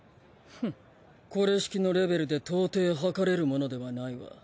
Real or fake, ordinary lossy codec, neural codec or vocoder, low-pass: real; none; none; none